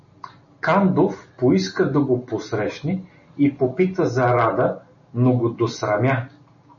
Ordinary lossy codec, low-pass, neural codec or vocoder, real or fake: MP3, 32 kbps; 7.2 kHz; none; real